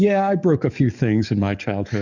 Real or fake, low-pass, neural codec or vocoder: fake; 7.2 kHz; codec, 44.1 kHz, 7.8 kbps, DAC